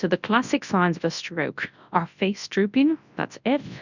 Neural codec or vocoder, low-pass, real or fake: codec, 24 kHz, 0.9 kbps, WavTokenizer, large speech release; 7.2 kHz; fake